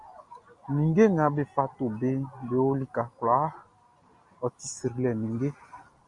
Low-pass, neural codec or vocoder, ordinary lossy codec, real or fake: 10.8 kHz; none; Opus, 64 kbps; real